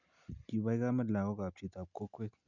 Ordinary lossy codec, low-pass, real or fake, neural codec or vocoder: none; 7.2 kHz; real; none